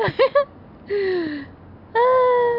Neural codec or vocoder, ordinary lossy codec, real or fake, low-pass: none; none; real; 5.4 kHz